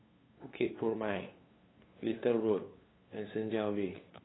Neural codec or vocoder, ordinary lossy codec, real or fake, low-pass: codec, 16 kHz, 2 kbps, FunCodec, trained on LibriTTS, 25 frames a second; AAC, 16 kbps; fake; 7.2 kHz